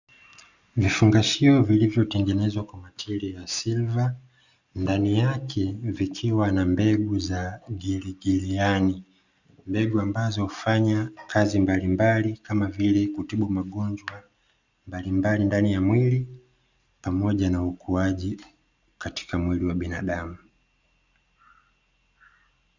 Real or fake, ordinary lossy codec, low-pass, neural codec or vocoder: real; Opus, 64 kbps; 7.2 kHz; none